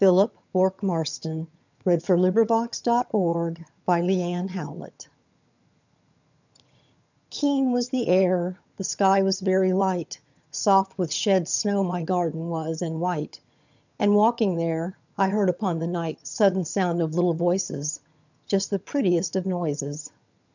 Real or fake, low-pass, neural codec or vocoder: fake; 7.2 kHz; vocoder, 22.05 kHz, 80 mel bands, HiFi-GAN